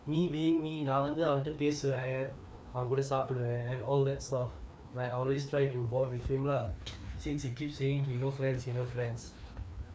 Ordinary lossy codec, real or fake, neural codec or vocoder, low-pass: none; fake; codec, 16 kHz, 2 kbps, FreqCodec, larger model; none